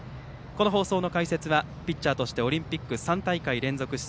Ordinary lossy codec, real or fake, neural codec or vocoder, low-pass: none; real; none; none